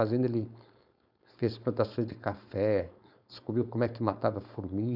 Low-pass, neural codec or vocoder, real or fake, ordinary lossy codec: 5.4 kHz; codec, 16 kHz, 4.8 kbps, FACodec; fake; none